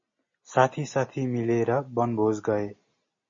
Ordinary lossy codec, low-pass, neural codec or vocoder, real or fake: MP3, 32 kbps; 7.2 kHz; none; real